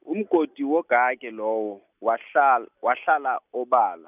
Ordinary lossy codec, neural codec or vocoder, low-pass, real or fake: none; none; 3.6 kHz; real